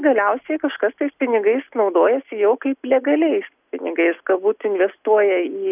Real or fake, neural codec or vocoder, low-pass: real; none; 3.6 kHz